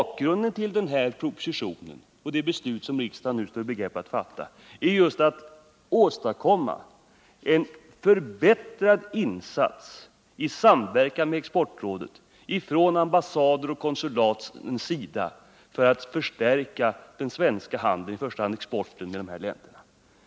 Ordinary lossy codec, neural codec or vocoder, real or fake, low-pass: none; none; real; none